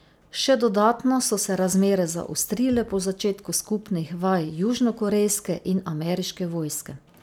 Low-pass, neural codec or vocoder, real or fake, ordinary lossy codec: none; none; real; none